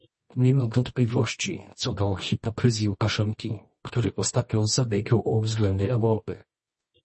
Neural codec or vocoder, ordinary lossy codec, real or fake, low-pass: codec, 24 kHz, 0.9 kbps, WavTokenizer, medium music audio release; MP3, 32 kbps; fake; 10.8 kHz